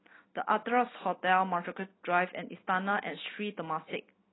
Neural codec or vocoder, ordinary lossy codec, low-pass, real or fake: none; AAC, 16 kbps; 7.2 kHz; real